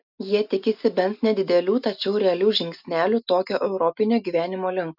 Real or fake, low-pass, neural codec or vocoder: real; 5.4 kHz; none